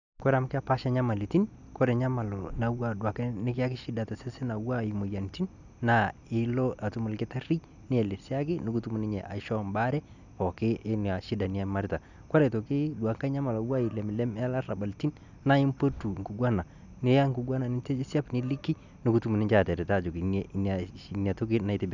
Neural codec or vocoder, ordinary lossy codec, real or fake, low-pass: none; none; real; 7.2 kHz